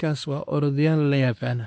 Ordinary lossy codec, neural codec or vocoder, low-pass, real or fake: none; codec, 16 kHz, 2 kbps, X-Codec, WavLM features, trained on Multilingual LibriSpeech; none; fake